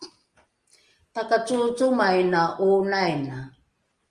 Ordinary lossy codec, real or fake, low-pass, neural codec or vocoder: Opus, 32 kbps; real; 10.8 kHz; none